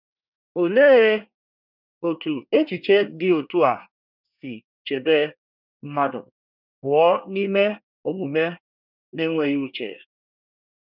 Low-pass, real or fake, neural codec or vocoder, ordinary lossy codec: 5.4 kHz; fake; codec, 24 kHz, 1 kbps, SNAC; none